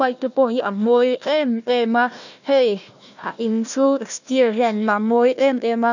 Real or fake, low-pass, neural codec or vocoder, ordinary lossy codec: fake; 7.2 kHz; codec, 16 kHz, 1 kbps, FunCodec, trained on Chinese and English, 50 frames a second; none